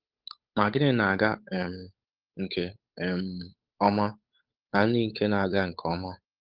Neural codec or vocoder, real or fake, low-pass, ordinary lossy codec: codec, 16 kHz, 8 kbps, FunCodec, trained on Chinese and English, 25 frames a second; fake; 5.4 kHz; Opus, 24 kbps